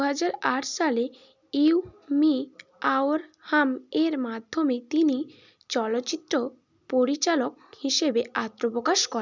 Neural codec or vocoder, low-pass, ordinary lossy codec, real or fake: none; 7.2 kHz; none; real